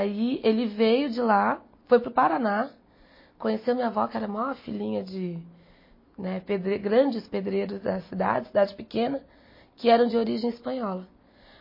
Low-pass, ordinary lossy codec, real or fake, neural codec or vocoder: 5.4 kHz; MP3, 24 kbps; real; none